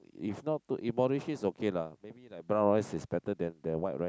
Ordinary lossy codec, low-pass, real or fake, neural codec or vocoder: none; none; real; none